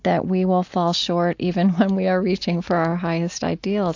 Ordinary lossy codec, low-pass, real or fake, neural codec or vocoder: AAC, 48 kbps; 7.2 kHz; real; none